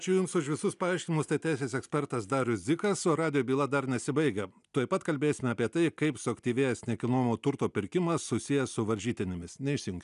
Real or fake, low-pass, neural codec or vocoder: real; 10.8 kHz; none